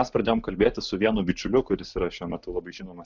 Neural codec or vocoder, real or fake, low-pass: none; real; 7.2 kHz